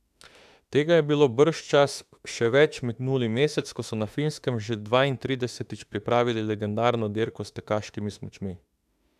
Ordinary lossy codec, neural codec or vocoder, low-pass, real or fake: AAC, 96 kbps; autoencoder, 48 kHz, 32 numbers a frame, DAC-VAE, trained on Japanese speech; 14.4 kHz; fake